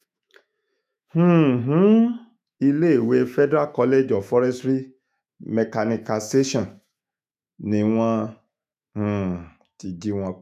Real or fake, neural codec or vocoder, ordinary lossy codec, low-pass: fake; autoencoder, 48 kHz, 128 numbers a frame, DAC-VAE, trained on Japanese speech; none; 14.4 kHz